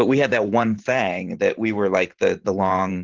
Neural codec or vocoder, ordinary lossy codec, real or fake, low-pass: vocoder, 44.1 kHz, 128 mel bands every 512 samples, BigVGAN v2; Opus, 16 kbps; fake; 7.2 kHz